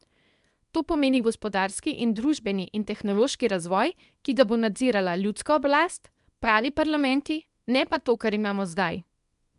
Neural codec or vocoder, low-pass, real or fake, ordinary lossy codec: codec, 24 kHz, 0.9 kbps, WavTokenizer, small release; 10.8 kHz; fake; MP3, 96 kbps